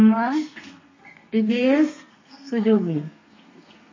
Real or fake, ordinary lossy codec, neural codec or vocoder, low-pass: fake; MP3, 32 kbps; codec, 32 kHz, 1.9 kbps, SNAC; 7.2 kHz